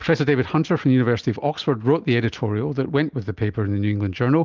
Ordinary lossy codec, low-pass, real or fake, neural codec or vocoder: Opus, 32 kbps; 7.2 kHz; real; none